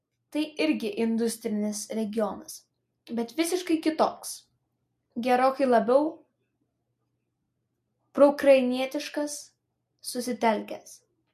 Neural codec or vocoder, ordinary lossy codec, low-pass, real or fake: none; MP3, 64 kbps; 14.4 kHz; real